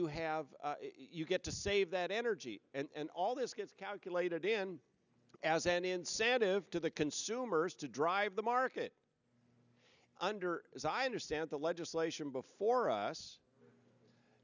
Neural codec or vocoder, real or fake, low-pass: none; real; 7.2 kHz